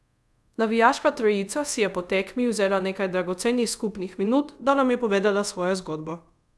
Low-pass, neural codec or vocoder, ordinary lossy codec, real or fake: none; codec, 24 kHz, 0.5 kbps, DualCodec; none; fake